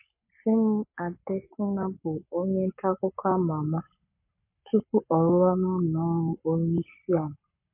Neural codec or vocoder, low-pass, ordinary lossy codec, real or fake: codec, 44.1 kHz, 7.8 kbps, Pupu-Codec; 3.6 kHz; MP3, 24 kbps; fake